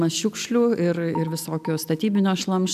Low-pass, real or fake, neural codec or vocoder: 14.4 kHz; real; none